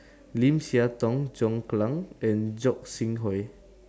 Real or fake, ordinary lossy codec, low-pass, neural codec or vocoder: real; none; none; none